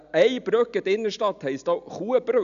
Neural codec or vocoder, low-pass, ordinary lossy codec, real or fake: none; 7.2 kHz; none; real